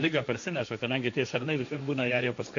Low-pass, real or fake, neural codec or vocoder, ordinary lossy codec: 7.2 kHz; fake; codec, 16 kHz, 1.1 kbps, Voila-Tokenizer; AAC, 48 kbps